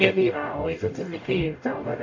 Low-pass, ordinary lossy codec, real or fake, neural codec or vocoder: 7.2 kHz; MP3, 48 kbps; fake; codec, 44.1 kHz, 0.9 kbps, DAC